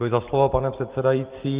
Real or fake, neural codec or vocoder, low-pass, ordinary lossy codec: real; none; 3.6 kHz; Opus, 32 kbps